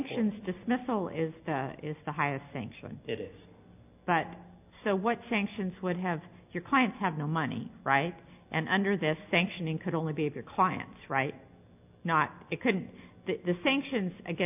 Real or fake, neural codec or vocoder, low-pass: real; none; 3.6 kHz